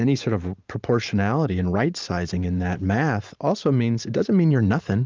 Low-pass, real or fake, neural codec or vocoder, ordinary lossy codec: 7.2 kHz; fake; vocoder, 44.1 kHz, 128 mel bands every 512 samples, BigVGAN v2; Opus, 24 kbps